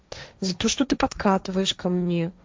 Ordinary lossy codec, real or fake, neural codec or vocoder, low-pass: none; fake; codec, 16 kHz, 1.1 kbps, Voila-Tokenizer; none